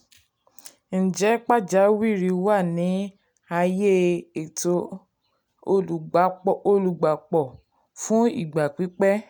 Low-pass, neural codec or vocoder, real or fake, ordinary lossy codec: none; none; real; none